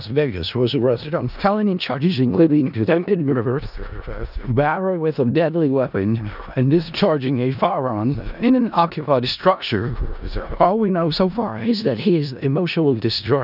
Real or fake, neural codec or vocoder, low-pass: fake; codec, 16 kHz in and 24 kHz out, 0.4 kbps, LongCat-Audio-Codec, four codebook decoder; 5.4 kHz